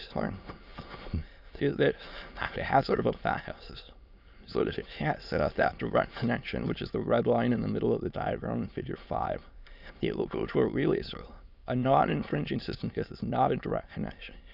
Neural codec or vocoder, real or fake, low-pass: autoencoder, 22.05 kHz, a latent of 192 numbers a frame, VITS, trained on many speakers; fake; 5.4 kHz